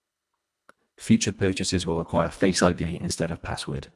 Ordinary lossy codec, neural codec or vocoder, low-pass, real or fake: none; codec, 24 kHz, 1.5 kbps, HILCodec; none; fake